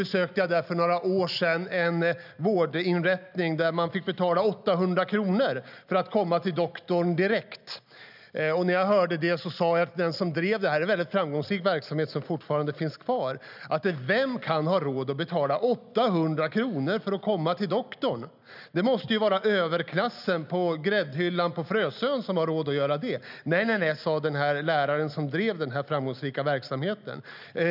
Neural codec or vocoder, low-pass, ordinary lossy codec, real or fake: none; 5.4 kHz; none; real